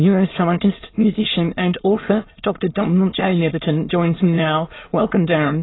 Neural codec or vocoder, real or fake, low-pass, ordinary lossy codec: autoencoder, 22.05 kHz, a latent of 192 numbers a frame, VITS, trained on many speakers; fake; 7.2 kHz; AAC, 16 kbps